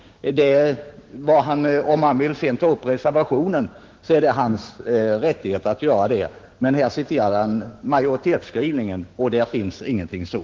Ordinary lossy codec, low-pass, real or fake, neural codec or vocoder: Opus, 16 kbps; 7.2 kHz; fake; codec, 16 kHz, 6 kbps, DAC